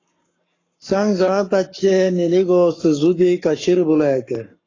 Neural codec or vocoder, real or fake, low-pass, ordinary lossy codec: codec, 44.1 kHz, 7.8 kbps, Pupu-Codec; fake; 7.2 kHz; AAC, 32 kbps